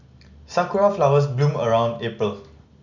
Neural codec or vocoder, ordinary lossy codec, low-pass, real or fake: none; none; 7.2 kHz; real